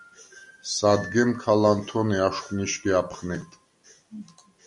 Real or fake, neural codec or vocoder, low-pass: real; none; 10.8 kHz